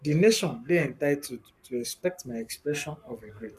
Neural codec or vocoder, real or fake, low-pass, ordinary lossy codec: codec, 44.1 kHz, 7.8 kbps, Pupu-Codec; fake; 14.4 kHz; none